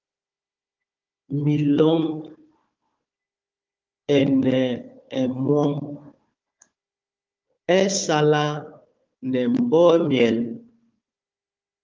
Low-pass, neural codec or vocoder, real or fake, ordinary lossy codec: 7.2 kHz; codec, 16 kHz, 4 kbps, FunCodec, trained on Chinese and English, 50 frames a second; fake; Opus, 24 kbps